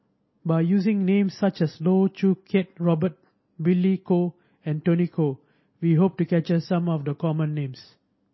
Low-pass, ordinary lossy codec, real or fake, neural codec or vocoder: 7.2 kHz; MP3, 24 kbps; real; none